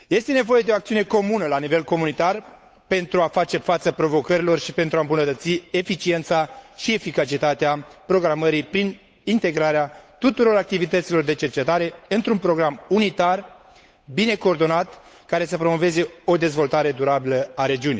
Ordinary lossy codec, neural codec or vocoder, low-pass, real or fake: none; codec, 16 kHz, 8 kbps, FunCodec, trained on Chinese and English, 25 frames a second; none; fake